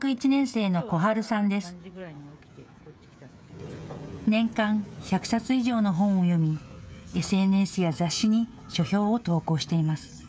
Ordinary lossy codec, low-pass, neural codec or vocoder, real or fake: none; none; codec, 16 kHz, 16 kbps, FreqCodec, smaller model; fake